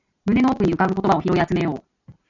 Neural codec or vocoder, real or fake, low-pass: none; real; 7.2 kHz